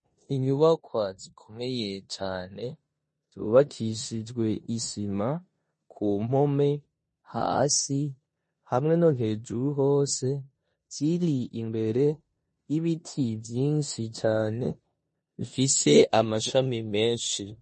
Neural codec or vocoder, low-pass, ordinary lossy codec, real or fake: codec, 16 kHz in and 24 kHz out, 0.9 kbps, LongCat-Audio-Codec, four codebook decoder; 10.8 kHz; MP3, 32 kbps; fake